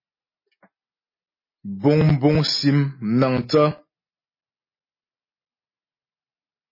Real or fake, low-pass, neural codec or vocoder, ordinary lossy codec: real; 5.4 kHz; none; MP3, 24 kbps